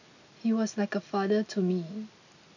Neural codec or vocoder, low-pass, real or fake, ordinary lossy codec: none; 7.2 kHz; real; none